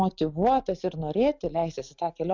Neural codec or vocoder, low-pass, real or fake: none; 7.2 kHz; real